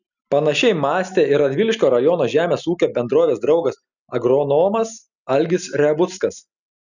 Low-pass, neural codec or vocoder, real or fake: 7.2 kHz; none; real